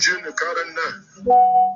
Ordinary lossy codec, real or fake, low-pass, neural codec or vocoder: MP3, 48 kbps; real; 7.2 kHz; none